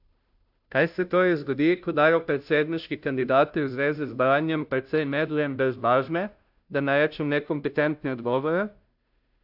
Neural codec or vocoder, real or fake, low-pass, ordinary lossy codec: codec, 16 kHz, 0.5 kbps, FunCodec, trained on Chinese and English, 25 frames a second; fake; 5.4 kHz; AAC, 48 kbps